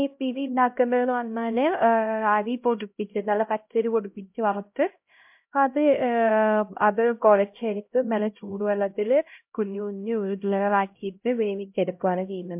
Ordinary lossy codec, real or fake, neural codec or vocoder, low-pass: MP3, 32 kbps; fake; codec, 16 kHz, 0.5 kbps, X-Codec, HuBERT features, trained on LibriSpeech; 3.6 kHz